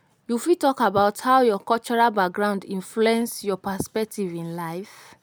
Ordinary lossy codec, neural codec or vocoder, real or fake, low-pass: none; none; real; none